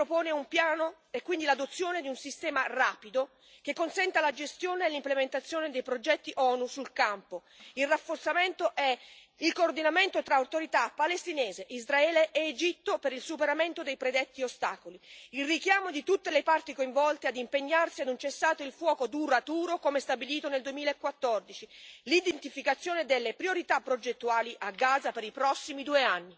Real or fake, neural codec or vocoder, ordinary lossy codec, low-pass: real; none; none; none